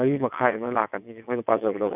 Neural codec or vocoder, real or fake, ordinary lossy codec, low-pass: vocoder, 22.05 kHz, 80 mel bands, WaveNeXt; fake; none; 3.6 kHz